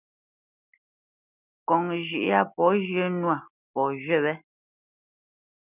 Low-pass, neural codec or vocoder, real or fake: 3.6 kHz; none; real